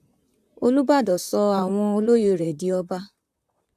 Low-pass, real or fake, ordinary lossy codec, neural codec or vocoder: 14.4 kHz; fake; none; vocoder, 44.1 kHz, 128 mel bands, Pupu-Vocoder